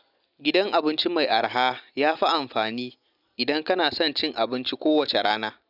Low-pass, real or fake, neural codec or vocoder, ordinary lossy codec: 5.4 kHz; real; none; none